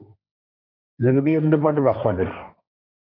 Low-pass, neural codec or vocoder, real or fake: 5.4 kHz; codec, 16 kHz, 1.1 kbps, Voila-Tokenizer; fake